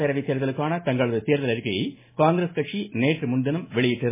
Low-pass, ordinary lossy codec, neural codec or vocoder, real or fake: 3.6 kHz; MP3, 16 kbps; none; real